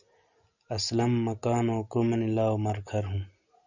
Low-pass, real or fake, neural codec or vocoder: 7.2 kHz; real; none